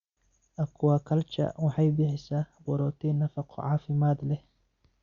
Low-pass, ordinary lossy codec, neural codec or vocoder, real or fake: 7.2 kHz; Opus, 64 kbps; none; real